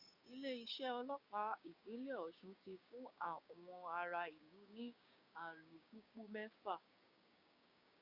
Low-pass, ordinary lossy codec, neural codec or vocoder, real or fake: 5.4 kHz; Opus, 64 kbps; codec, 16 kHz, 6 kbps, DAC; fake